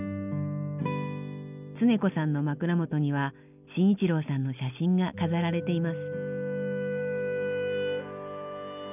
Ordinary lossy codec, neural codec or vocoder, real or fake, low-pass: none; none; real; 3.6 kHz